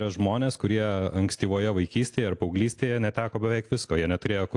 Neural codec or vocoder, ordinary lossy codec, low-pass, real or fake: none; AAC, 64 kbps; 10.8 kHz; real